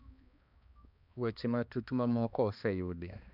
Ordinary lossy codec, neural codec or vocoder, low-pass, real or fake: none; codec, 16 kHz, 2 kbps, X-Codec, HuBERT features, trained on balanced general audio; 5.4 kHz; fake